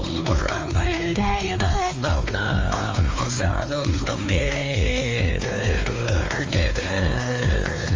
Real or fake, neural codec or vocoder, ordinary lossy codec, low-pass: fake; codec, 16 kHz, 2 kbps, X-Codec, WavLM features, trained on Multilingual LibriSpeech; Opus, 32 kbps; 7.2 kHz